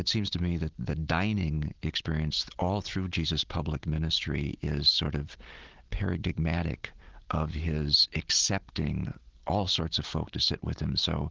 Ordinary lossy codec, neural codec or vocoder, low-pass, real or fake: Opus, 16 kbps; none; 7.2 kHz; real